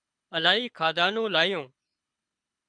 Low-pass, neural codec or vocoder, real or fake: 9.9 kHz; codec, 24 kHz, 6 kbps, HILCodec; fake